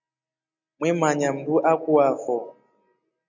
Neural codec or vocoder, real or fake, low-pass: none; real; 7.2 kHz